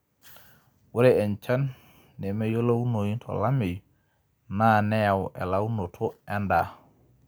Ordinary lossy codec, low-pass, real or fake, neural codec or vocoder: none; none; real; none